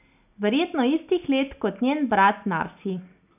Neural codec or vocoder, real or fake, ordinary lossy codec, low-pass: none; real; none; 3.6 kHz